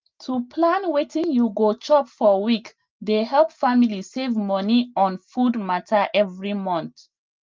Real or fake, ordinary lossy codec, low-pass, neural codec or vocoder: real; Opus, 32 kbps; 7.2 kHz; none